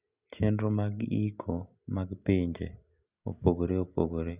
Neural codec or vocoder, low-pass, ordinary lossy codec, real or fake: none; 3.6 kHz; Opus, 64 kbps; real